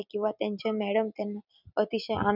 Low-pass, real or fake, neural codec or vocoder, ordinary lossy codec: 5.4 kHz; real; none; none